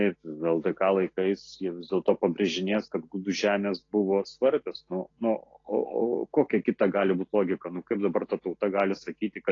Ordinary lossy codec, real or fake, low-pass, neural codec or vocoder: AAC, 32 kbps; real; 7.2 kHz; none